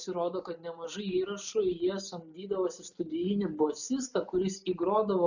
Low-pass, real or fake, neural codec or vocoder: 7.2 kHz; real; none